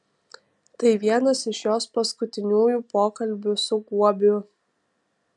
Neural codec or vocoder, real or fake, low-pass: none; real; 10.8 kHz